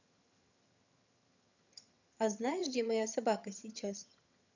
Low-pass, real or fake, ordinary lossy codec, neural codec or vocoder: 7.2 kHz; fake; none; vocoder, 22.05 kHz, 80 mel bands, HiFi-GAN